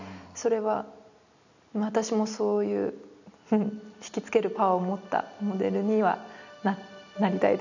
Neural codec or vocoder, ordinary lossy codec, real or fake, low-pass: none; none; real; 7.2 kHz